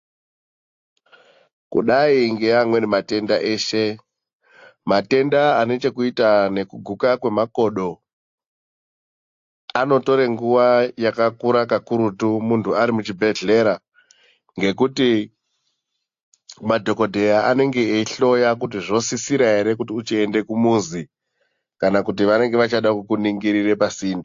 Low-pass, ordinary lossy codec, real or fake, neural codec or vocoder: 7.2 kHz; AAC, 48 kbps; real; none